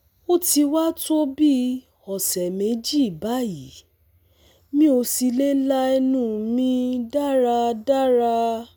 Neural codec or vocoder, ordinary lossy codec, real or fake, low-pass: none; none; real; none